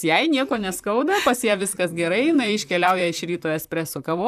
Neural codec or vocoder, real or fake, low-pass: vocoder, 44.1 kHz, 128 mel bands, Pupu-Vocoder; fake; 14.4 kHz